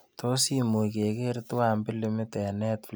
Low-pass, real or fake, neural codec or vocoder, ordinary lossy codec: none; real; none; none